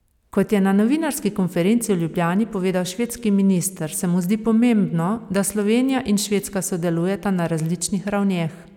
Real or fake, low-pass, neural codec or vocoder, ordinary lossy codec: real; 19.8 kHz; none; none